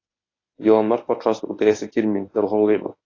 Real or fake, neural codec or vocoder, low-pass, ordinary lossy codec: fake; codec, 24 kHz, 0.9 kbps, WavTokenizer, medium speech release version 1; 7.2 kHz; AAC, 32 kbps